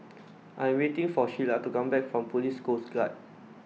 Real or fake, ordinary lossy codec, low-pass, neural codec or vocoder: real; none; none; none